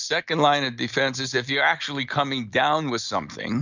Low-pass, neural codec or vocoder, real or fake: 7.2 kHz; none; real